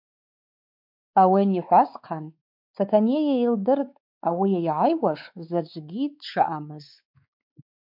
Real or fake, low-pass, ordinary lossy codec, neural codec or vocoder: fake; 5.4 kHz; MP3, 48 kbps; codec, 16 kHz, 4 kbps, X-Codec, WavLM features, trained on Multilingual LibriSpeech